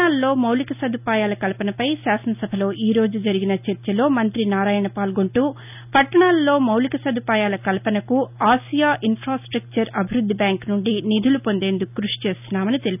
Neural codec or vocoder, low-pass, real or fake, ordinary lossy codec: none; 3.6 kHz; real; none